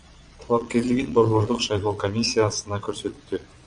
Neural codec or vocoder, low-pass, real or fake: vocoder, 22.05 kHz, 80 mel bands, Vocos; 9.9 kHz; fake